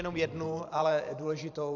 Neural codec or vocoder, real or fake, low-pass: vocoder, 44.1 kHz, 128 mel bands every 512 samples, BigVGAN v2; fake; 7.2 kHz